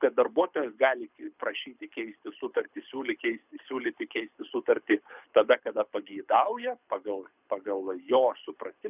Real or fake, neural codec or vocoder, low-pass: fake; codec, 24 kHz, 6 kbps, HILCodec; 3.6 kHz